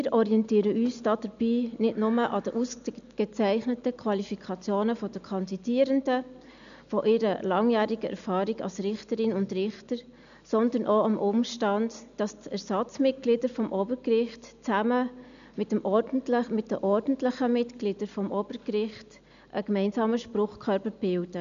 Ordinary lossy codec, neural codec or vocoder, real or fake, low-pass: none; none; real; 7.2 kHz